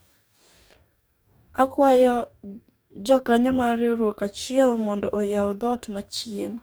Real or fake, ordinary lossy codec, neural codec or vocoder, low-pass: fake; none; codec, 44.1 kHz, 2.6 kbps, DAC; none